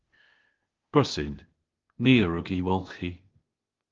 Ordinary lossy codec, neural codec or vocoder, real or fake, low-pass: Opus, 32 kbps; codec, 16 kHz, 0.8 kbps, ZipCodec; fake; 7.2 kHz